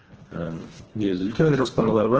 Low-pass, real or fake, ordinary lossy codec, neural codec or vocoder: 7.2 kHz; fake; Opus, 16 kbps; codec, 24 kHz, 1.5 kbps, HILCodec